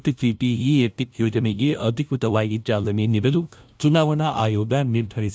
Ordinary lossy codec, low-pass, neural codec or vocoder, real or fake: none; none; codec, 16 kHz, 0.5 kbps, FunCodec, trained on LibriTTS, 25 frames a second; fake